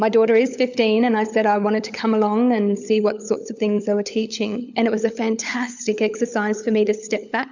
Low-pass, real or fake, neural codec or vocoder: 7.2 kHz; fake; codec, 16 kHz, 16 kbps, FunCodec, trained on LibriTTS, 50 frames a second